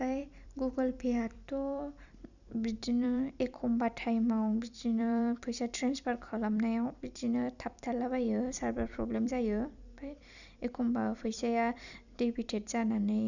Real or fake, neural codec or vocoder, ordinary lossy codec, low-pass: fake; vocoder, 44.1 kHz, 128 mel bands every 256 samples, BigVGAN v2; none; 7.2 kHz